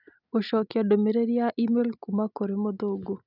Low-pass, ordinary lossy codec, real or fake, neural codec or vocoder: 5.4 kHz; none; real; none